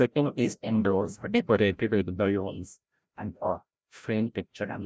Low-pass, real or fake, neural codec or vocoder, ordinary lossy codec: none; fake; codec, 16 kHz, 0.5 kbps, FreqCodec, larger model; none